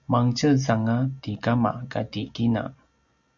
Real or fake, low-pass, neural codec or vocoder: real; 7.2 kHz; none